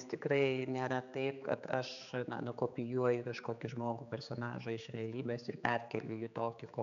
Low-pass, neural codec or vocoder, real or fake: 7.2 kHz; codec, 16 kHz, 4 kbps, X-Codec, HuBERT features, trained on general audio; fake